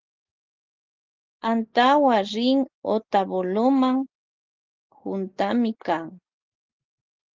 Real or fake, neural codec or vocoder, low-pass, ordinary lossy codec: real; none; 7.2 kHz; Opus, 16 kbps